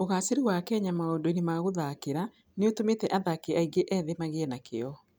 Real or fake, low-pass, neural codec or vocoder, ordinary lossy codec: real; none; none; none